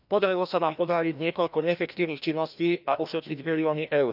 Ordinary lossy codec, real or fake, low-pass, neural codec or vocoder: none; fake; 5.4 kHz; codec, 16 kHz, 1 kbps, FreqCodec, larger model